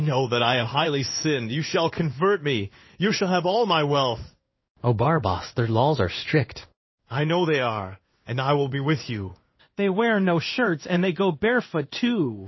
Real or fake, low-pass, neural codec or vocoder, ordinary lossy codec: fake; 7.2 kHz; codec, 16 kHz in and 24 kHz out, 1 kbps, XY-Tokenizer; MP3, 24 kbps